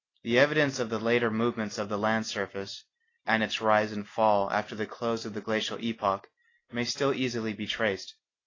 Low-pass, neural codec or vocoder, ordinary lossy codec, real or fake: 7.2 kHz; none; AAC, 32 kbps; real